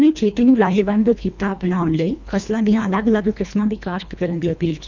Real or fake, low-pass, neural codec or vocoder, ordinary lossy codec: fake; 7.2 kHz; codec, 24 kHz, 1.5 kbps, HILCodec; none